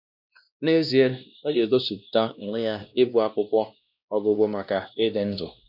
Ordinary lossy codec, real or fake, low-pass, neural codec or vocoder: none; fake; 5.4 kHz; codec, 16 kHz, 1 kbps, X-Codec, WavLM features, trained on Multilingual LibriSpeech